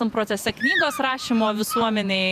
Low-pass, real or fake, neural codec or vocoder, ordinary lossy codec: 14.4 kHz; fake; vocoder, 48 kHz, 128 mel bands, Vocos; MP3, 96 kbps